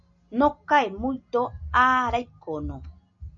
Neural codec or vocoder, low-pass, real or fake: none; 7.2 kHz; real